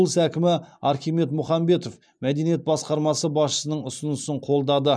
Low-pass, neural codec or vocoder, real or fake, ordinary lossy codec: none; none; real; none